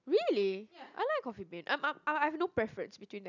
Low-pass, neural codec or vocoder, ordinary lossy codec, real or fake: 7.2 kHz; none; none; real